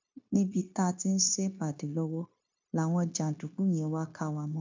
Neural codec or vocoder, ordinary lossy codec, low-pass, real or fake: codec, 16 kHz, 0.9 kbps, LongCat-Audio-Codec; MP3, 64 kbps; 7.2 kHz; fake